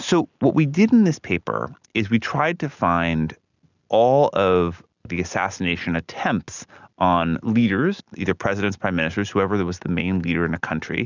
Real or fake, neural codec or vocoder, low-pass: real; none; 7.2 kHz